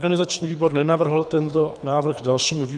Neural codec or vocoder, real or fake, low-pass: codec, 24 kHz, 3 kbps, HILCodec; fake; 9.9 kHz